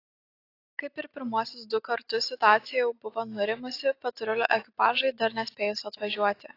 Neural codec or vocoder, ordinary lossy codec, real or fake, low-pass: none; AAC, 32 kbps; real; 5.4 kHz